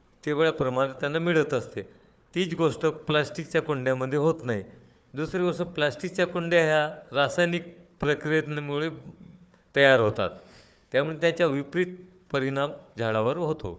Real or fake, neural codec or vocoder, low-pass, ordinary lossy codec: fake; codec, 16 kHz, 4 kbps, FunCodec, trained on Chinese and English, 50 frames a second; none; none